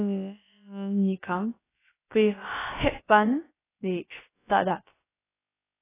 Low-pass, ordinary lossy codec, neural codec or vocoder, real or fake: 3.6 kHz; AAC, 16 kbps; codec, 16 kHz, about 1 kbps, DyCAST, with the encoder's durations; fake